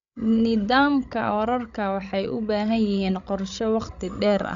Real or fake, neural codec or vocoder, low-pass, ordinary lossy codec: fake; codec, 16 kHz, 16 kbps, FreqCodec, larger model; 7.2 kHz; Opus, 64 kbps